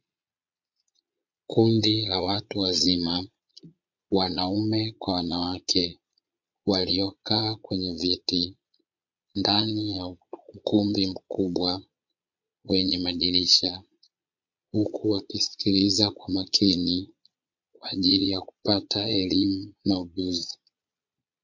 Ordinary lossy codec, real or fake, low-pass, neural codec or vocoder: MP3, 48 kbps; fake; 7.2 kHz; vocoder, 22.05 kHz, 80 mel bands, Vocos